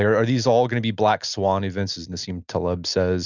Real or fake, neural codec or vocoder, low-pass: real; none; 7.2 kHz